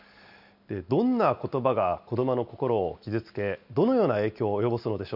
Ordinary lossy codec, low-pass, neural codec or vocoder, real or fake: none; 5.4 kHz; none; real